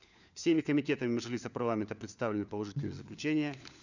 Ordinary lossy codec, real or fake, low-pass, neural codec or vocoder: none; fake; 7.2 kHz; codec, 16 kHz, 4 kbps, FunCodec, trained on LibriTTS, 50 frames a second